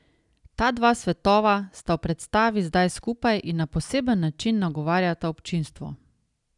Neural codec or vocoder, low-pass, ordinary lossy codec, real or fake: none; 10.8 kHz; none; real